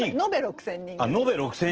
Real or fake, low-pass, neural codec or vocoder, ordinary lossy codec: real; 7.2 kHz; none; Opus, 16 kbps